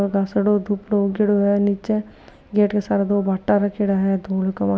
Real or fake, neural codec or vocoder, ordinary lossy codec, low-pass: real; none; none; none